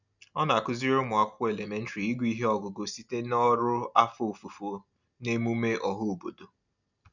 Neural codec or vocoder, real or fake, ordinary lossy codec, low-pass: none; real; none; 7.2 kHz